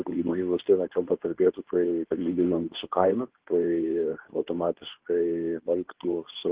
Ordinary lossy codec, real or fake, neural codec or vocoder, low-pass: Opus, 16 kbps; fake; codec, 24 kHz, 0.9 kbps, WavTokenizer, medium speech release version 2; 3.6 kHz